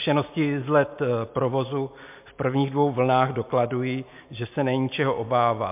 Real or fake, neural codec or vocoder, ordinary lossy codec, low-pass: real; none; MP3, 32 kbps; 3.6 kHz